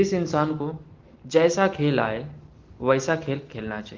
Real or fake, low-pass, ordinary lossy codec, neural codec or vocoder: real; 7.2 kHz; Opus, 16 kbps; none